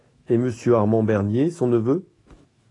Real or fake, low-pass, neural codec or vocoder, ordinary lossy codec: fake; 10.8 kHz; autoencoder, 48 kHz, 128 numbers a frame, DAC-VAE, trained on Japanese speech; AAC, 32 kbps